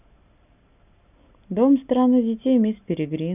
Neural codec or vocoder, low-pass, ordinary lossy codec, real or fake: none; 3.6 kHz; none; real